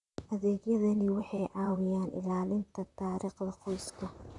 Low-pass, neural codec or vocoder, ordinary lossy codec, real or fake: 10.8 kHz; vocoder, 44.1 kHz, 128 mel bands, Pupu-Vocoder; none; fake